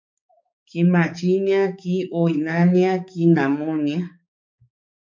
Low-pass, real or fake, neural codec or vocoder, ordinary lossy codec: 7.2 kHz; fake; codec, 16 kHz, 4 kbps, X-Codec, HuBERT features, trained on balanced general audio; MP3, 64 kbps